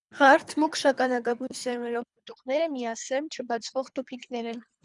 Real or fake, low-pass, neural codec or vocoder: fake; 10.8 kHz; codec, 24 kHz, 3 kbps, HILCodec